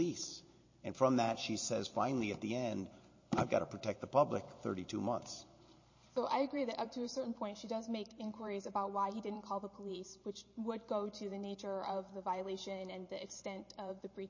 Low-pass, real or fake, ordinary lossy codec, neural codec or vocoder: 7.2 kHz; fake; MP3, 32 kbps; vocoder, 44.1 kHz, 128 mel bands every 512 samples, BigVGAN v2